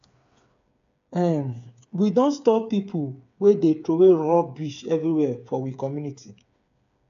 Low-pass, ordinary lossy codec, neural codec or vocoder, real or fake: 7.2 kHz; AAC, 64 kbps; codec, 16 kHz, 8 kbps, FreqCodec, smaller model; fake